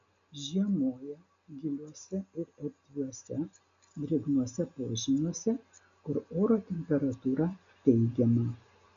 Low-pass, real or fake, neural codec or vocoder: 7.2 kHz; real; none